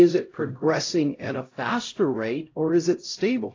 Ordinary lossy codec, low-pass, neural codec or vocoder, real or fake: AAC, 32 kbps; 7.2 kHz; codec, 16 kHz, 0.5 kbps, X-Codec, HuBERT features, trained on LibriSpeech; fake